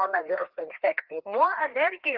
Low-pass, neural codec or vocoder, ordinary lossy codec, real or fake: 5.4 kHz; codec, 16 kHz, 2 kbps, FreqCodec, larger model; Opus, 16 kbps; fake